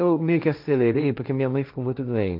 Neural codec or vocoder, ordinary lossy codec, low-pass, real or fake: codec, 16 kHz, 1.1 kbps, Voila-Tokenizer; none; 5.4 kHz; fake